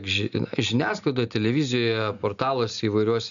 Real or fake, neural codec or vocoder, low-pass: real; none; 7.2 kHz